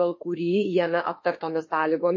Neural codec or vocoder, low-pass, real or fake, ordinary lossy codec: autoencoder, 48 kHz, 32 numbers a frame, DAC-VAE, trained on Japanese speech; 7.2 kHz; fake; MP3, 32 kbps